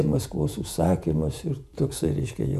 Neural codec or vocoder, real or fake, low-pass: vocoder, 48 kHz, 128 mel bands, Vocos; fake; 14.4 kHz